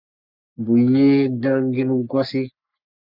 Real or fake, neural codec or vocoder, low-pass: fake; codec, 44.1 kHz, 3.4 kbps, Pupu-Codec; 5.4 kHz